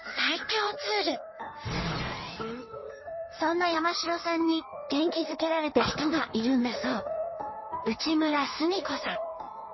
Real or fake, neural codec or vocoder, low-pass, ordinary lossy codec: fake; codec, 16 kHz in and 24 kHz out, 1.1 kbps, FireRedTTS-2 codec; 7.2 kHz; MP3, 24 kbps